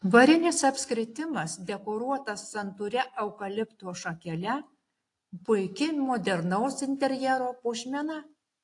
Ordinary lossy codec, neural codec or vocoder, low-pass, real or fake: AAC, 48 kbps; none; 10.8 kHz; real